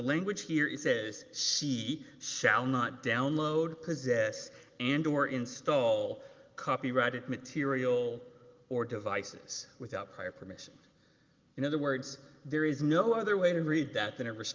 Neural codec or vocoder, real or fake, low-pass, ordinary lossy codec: none; real; 7.2 kHz; Opus, 32 kbps